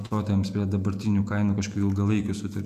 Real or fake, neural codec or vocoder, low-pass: fake; vocoder, 48 kHz, 128 mel bands, Vocos; 14.4 kHz